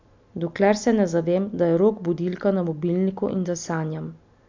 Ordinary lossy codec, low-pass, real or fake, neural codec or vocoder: MP3, 64 kbps; 7.2 kHz; real; none